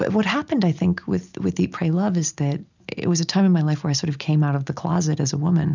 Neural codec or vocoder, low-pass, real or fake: none; 7.2 kHz; real